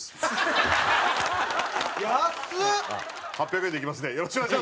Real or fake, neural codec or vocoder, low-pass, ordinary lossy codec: real; none; none; none